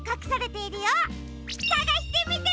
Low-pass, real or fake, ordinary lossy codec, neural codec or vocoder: none; real; none; none